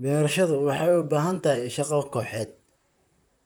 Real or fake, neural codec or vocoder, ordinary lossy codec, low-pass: fake; vocoder, 44.1 kHz, 128 mel bands, Pupu-Vocoder; none; none